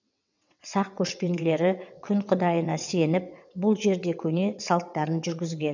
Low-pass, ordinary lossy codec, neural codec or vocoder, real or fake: 7.2 kHz; none; vocoder, 22.05 kHz, 80 mel bands, WaveNeXt; fake